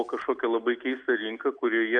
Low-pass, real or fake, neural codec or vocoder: 9.9 kHz; real; none